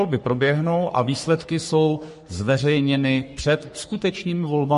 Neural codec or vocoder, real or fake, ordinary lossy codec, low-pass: codec, 44.1 kHz, 3.4 kbps, Pupu-Codec; fake; MP3, 48 kbps; 14.4 kHz